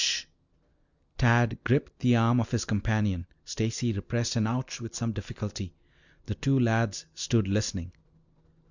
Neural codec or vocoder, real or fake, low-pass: none; real; 7.2 kHz